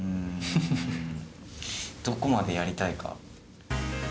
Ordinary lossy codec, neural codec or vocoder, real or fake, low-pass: none; none; real; none